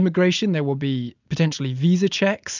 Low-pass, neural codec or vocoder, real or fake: 7.2 kHz; none; real